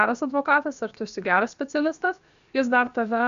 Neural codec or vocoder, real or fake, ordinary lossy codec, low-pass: codec, 16 kHz, about 1 kbps, DyCAST, with the encoder's durations; fake; Opus, 64 kbps; 7.2 kHz